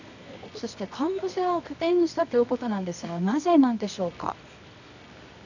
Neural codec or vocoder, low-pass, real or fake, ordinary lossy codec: codec, 24 kHz, 0.9 kbps, WavTokenizer, medium music audio release; 7.2 kHz; fake; none